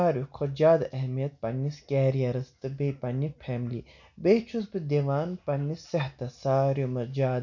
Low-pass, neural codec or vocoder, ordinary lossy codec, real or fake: 7.2 kHz; none; none; real